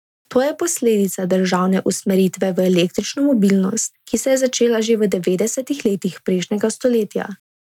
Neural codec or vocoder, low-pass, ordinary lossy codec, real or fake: none; 19.8 kHz; none; real